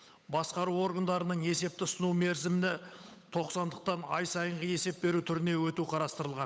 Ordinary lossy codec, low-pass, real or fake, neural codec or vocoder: none; none; fake; codec, 16 kHz, 8 kbps, FunCodec, trained on Chinese and English, 25 frames a second